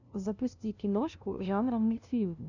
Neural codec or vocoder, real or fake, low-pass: codec, 16 kHz, 0.5 kbps, FunCodec, trained on LibriTTS, 25 frames a second; fake; 7.2 kHz